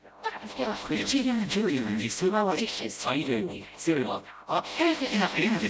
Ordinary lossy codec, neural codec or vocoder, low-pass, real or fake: none; codec, 16 kHz, 0.5 kbps, FreqCodec, smaller model; none; fake